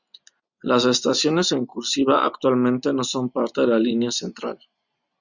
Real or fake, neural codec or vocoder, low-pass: real; none; 7.2 kHz